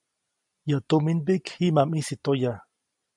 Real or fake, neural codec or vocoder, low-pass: real; none; 10.8 kHz